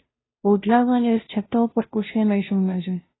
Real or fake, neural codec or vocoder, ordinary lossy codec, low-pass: fake; codec, 16 kHz, 0.5 kbps, FunCodec, trained on Chinese and English, 25 frames a second; AAC, 16 kbps; 7.2 kHz